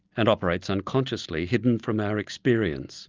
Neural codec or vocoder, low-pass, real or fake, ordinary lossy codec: none; 7.2 kHz; real; Opus, 24 kbps